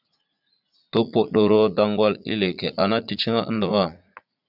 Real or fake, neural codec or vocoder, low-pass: fake; vocoder, 44.1 kHz, 80 mel bands, Vocos; 5.4 kHz